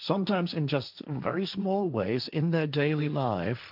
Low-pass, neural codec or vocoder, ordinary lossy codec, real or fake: 5.4 kHz; codec, 16 kHz, 1.1 kbps, Voila-Tokenizer; AAC, 48 kbps; fake